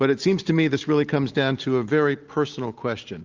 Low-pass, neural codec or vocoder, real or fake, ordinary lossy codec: 7.2 kHz; none; real; Opus, 32 kbps